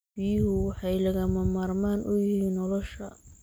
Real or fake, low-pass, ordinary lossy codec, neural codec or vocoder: real; none; none; none